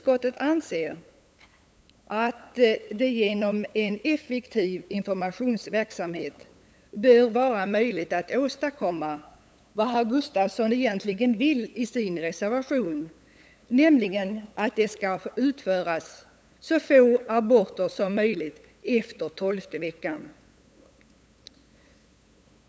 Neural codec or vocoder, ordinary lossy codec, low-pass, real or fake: codec, 16 kHz, 8 kbps, FunCodec, trained on LibriTTS, 25 frames a second; none; none; fake